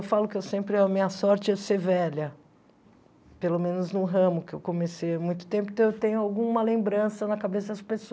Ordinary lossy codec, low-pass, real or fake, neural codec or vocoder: none; none; real; none